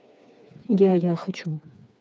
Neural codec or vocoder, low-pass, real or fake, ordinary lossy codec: codec, 16 kHz, 4 kbps, FreqCodec, smaller model; none; fake; none